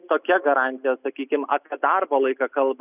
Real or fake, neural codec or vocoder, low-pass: real; none; 3.6 kHz